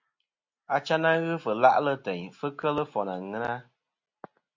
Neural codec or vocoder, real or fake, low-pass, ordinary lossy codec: none; real; 7.2 kHz; MP3, 48 kbps